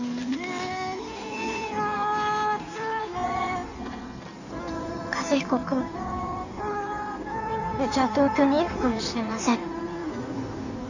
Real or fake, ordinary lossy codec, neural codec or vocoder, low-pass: fake; none; codec, 16 kHz in and 24 kHz out, 1.1 kbps, FireRedTTS-2 codec; 7.2 kHz